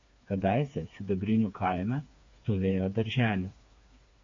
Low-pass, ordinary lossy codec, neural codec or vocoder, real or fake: 7.2 kHz; AAC, 48 kbps; codec, 16 kHz, 4 kbps, FreqCodec, smaller model; fake